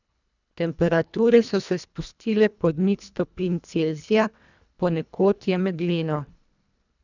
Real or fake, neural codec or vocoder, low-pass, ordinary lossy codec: fake; codec, 24 kHz, 1.5 kbps, HILCodec; 7.2 kHz; none